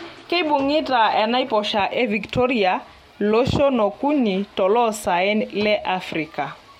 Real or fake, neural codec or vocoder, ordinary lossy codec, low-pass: real; none; MP3, 64 kbps; 19.8 kHz